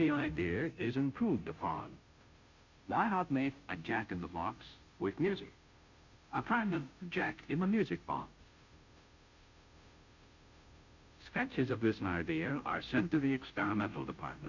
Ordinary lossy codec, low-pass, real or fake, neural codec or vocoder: Opus, 64 kbps; 7.2 kHz; fake; codec, 16 kHz, 0.5 kbps, FunCodec, trained on Chinese and English, 25 frames a second